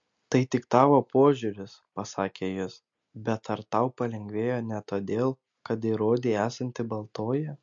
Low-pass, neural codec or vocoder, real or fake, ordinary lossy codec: 7.2 kHz; none; real; MP3, 48 kbps